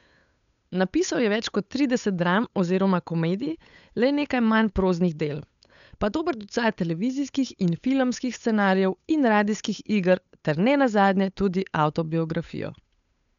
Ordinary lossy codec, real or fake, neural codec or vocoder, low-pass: none; fake; codec, 16 kHz, 8 kbps, FunCodec, trained on Chinese and English, 25 frames a second; 7.2 kHz